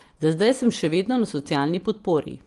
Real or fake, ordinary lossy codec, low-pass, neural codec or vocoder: fake; Opus, 24 kbps; 10.8 kHz; vocoder, 24 kHz, 100 mel bands, Vocos